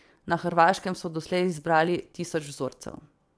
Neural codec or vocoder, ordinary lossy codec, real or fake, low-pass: vocoder, 22.05 kHz, 80 mel bands, WaveNeXt; none; fake; none